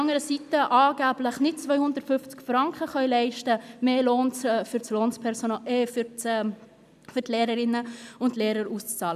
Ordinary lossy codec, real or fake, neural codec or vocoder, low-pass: none; real; none; 14.4 kHz